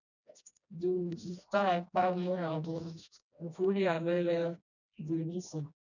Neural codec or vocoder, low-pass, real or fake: codec, 16 kHz, 1 kbps, FreqCodec, smaller model; 7.2 kHz; fake